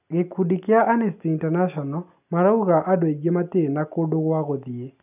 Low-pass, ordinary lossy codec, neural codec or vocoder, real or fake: 3.6 kHz; none; none; real